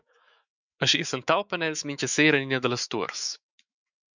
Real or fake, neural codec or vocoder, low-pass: fake; autoencoder, 48 kHz, 128 numbers a frame, DAC-VAE, trained on Japanese speech; 7.2 kHz